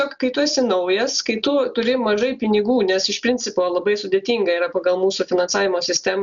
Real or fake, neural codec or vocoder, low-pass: real; none; 7.2 kHz